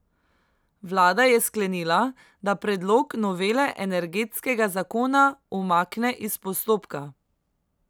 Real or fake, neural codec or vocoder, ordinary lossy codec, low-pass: real; none; none; none